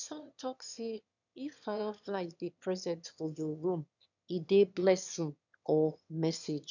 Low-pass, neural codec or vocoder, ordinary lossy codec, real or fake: 7.2 kHz; autoencoder, 22.05 kHz, a latent of 192 numbers a frame, VITS, trained on one speaker; none; fake